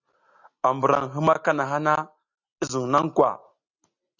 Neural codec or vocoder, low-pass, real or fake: none; 7.2 kHz; real